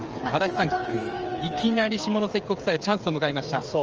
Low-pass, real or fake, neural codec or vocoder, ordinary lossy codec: 7.2 kHz; fake; codec, 16 kHz, 8 kbps, FreqCodec, smaller model; Opus, 24 kbps